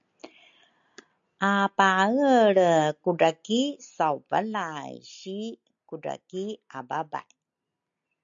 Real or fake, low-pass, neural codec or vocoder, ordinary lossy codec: real; 7.2 kHz; none; AAC, 64 kbps